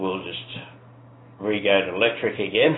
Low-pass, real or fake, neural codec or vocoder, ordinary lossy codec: 7.2 kHz; real; none; AAC, 16 kbps